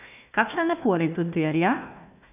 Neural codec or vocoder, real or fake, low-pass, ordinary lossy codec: codec, 16 kHz, 1 kbps, FunCodec, trained on Chinese and English, 50 frames a second; fake; 3.6 kHz; none